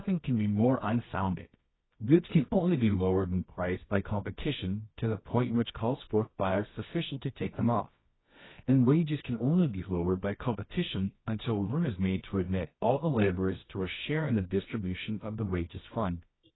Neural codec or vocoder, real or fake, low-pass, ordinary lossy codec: codec, 24 kHz, 0.9 kbps, WavTokenizer, medium music audio release; fake; 7.2 kHz; AAC, 16 kbps